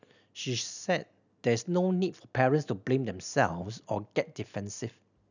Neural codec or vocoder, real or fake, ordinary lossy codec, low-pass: none; real; none; 7.2 kHz